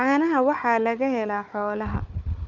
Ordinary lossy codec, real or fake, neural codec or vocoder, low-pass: none; fake; codec, 16 kHz, 4 kbps, FunCodec, trained on Chinese and English, 50 frames a second; 7.2 kHz